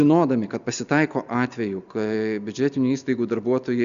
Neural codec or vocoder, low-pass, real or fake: none; 7.2 kHz; real